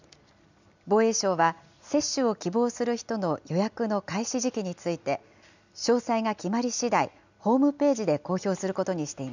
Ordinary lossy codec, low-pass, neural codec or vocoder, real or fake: none; 7.2 kHz; none; real